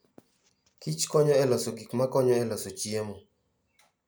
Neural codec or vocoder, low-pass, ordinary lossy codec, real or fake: none; none; none; real